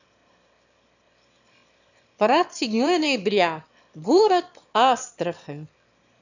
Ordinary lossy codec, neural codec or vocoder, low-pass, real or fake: none; autoencoder, 22.05 kHz, a latent of 192 numbers a frame, VITS, trained on one speaker; 7.2 kHz; fake